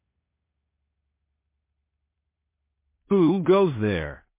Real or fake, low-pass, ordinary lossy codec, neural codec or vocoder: fake; 3.6 kHz; MP3, 24 kbps; codec, 16 kHz in and 24 kHz out, 0.4 kbps, LongCat-Audio-Codec, two codebook decoder